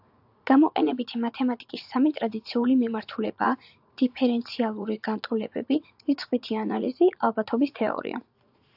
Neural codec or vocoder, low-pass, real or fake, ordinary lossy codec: vocoder, 44.1 kHz, 80 mel bands, Vocos; 5.4 kHz; fake; AAC, 48 kbps